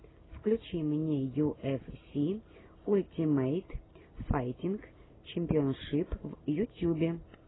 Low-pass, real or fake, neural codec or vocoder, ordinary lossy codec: 7.2 kHz; real; none; AAC, 16 kbps